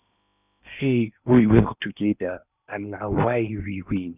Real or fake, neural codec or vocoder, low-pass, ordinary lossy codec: fake; codec, 16 kHz in and 24 kHz out, 0.8 kbps, FocalCodec, streaming, 65536 codes; 3.6 kHz; none